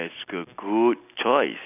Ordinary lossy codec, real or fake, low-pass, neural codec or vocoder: none; real; 3.6 kHz; none